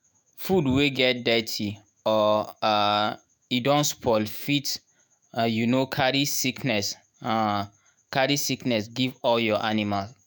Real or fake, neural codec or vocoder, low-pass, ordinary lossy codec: fake; autoencoder, 48 kHz, 128 numbers a frame, DAC-VAE, trained on Japanese speech; none; none